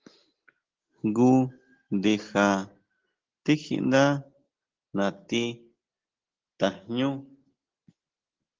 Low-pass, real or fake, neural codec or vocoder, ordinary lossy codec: 7.2 kHz; real; none; Opus, 16 kbps